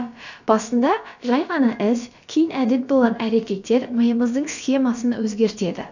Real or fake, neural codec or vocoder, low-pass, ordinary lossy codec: fake; codec, 16 kHz, about 1 kbps, DyCAST, with the encoder's durations; 7.2 kHz; none